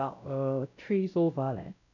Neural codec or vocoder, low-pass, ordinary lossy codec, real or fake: codec, 16 kHz, 0.5 kbps, X-Codec, WavLM features, trained on Multilingual LibriSpeech; 7.2 kHz; none; fake